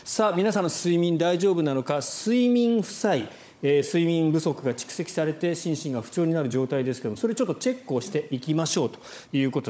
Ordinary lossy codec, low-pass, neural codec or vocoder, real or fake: none; none; codec, 16 kHz, 16 kbps, FunCodec, trained on Chinese and English, 50 frames a second; fake